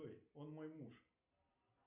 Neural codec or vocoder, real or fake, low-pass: none; real; 3.6 kHz